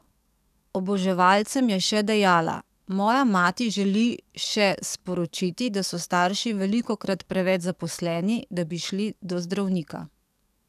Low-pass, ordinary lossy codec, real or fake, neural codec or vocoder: 14.4 kHz; none; fake; codec, 44.1 kHz, 7.8 kbps, DAC